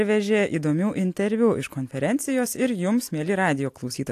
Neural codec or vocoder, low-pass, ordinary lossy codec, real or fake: none; 14.4 kHz; AAC, 64 kbps; real